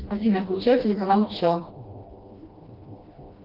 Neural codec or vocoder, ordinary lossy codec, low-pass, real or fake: codec, 16 kHz, 1 kbps, FreqCodec, smaller model; Opus, 24 kbps; 5.4 kHz; fake